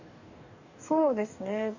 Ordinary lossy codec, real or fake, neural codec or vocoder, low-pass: none; fake; codec, 44.1 kHz, 2.6 kbps, DAC; 7.2 kHz